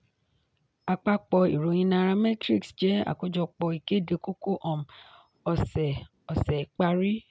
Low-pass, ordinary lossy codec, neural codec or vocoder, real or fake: none; none; none; real